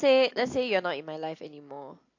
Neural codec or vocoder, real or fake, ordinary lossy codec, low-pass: none; real; AAC, 48 kbps; 7.2 kHz